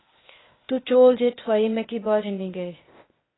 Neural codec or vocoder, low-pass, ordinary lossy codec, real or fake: codec, 16 kHz, 0.8 kbps, ZipCodec; 7.2 kHz; AAC, 16 kbps; fake